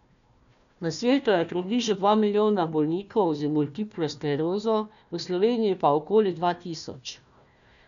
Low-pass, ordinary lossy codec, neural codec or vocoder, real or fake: 7.2 kHz; none; codec, 16 kHz, 1 kbps, FunCodec, trained on Chinese and English, 50 frames a second; fake